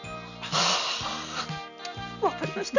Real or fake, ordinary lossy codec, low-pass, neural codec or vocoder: real; none; 7.2 kHz; none